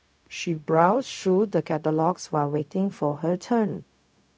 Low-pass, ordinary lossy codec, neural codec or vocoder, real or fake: none; none; codec, 16 kHz, 0.4 kbps, LongCat-Audio-Codec; fake